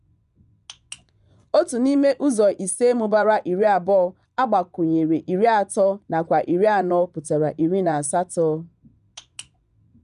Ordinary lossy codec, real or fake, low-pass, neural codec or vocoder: none; fake; 10.8 kHz; vocoder, 24 kHz, 100 mel bands, Vocos